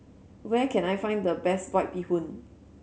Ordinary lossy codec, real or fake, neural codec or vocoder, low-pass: none; real; none; none